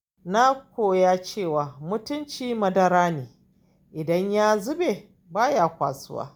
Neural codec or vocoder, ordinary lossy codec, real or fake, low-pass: none; none; real; none